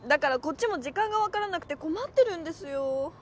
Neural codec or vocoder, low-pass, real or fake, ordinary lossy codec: none; none; real; none